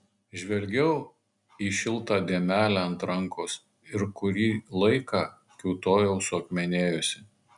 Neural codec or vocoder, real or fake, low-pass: none; real; 10.8 kHz